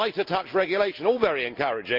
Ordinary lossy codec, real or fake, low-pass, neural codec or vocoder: Opus, 24 kbps; real; 5.4 kHz; none